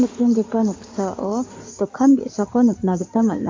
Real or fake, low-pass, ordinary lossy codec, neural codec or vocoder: fake; 7.2 kHz; MP3, 48 kbps; codec, 16 kHz in and 24 kHz out, 2.2 kbps, FireRedTTS-2 codec